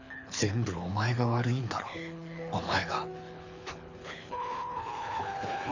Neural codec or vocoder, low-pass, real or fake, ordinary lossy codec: codec, 24 kHz, 6 kbps, HILCodec; 7.2 kHz; fake; none